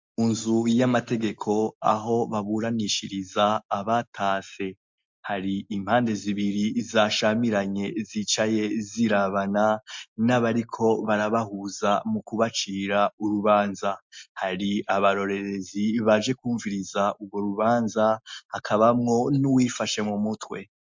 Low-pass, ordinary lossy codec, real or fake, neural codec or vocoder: 7.2 kHz; MP3, 64 kbps; real; none